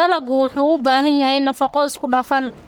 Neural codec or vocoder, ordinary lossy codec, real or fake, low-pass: codec, 44.1 kHz, 1.7 kbps, Pupu-Codec; none; fake; none